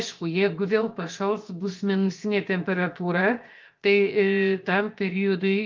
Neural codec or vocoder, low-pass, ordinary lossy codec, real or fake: codec, 16 kHz, 0.7 kbps, FocalCodec; 7.2 kHz; Opus, 32 kbps; fake